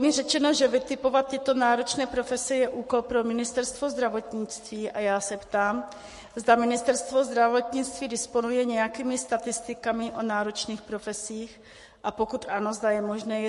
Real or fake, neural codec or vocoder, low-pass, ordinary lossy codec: fake; codec, 44.1 kHz, 7.8 kbps, Pupu-Codec; 14.4 kHz; MP3, 48 kbps